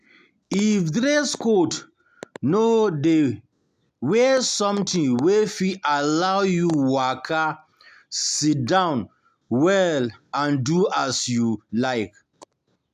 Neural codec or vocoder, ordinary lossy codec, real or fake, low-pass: none; none; real; 14.4 kHz